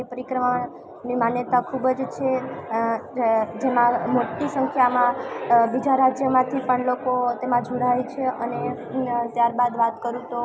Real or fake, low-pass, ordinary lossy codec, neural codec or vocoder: real; none; none; none